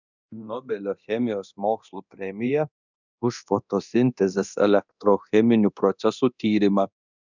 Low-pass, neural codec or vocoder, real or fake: 7.2 kHz; codec, 24 kHz, 0.9 kbps, DualCodec; fake